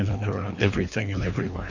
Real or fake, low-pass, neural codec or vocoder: fake; 7.2 kHz; codec, 24 kHz, 3 kbps, HILCodec